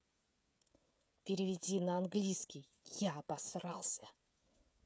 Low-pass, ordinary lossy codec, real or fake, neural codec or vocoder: none; none; fake; codec, 16 kHz, 16 kbps, FreqCodec, smaller model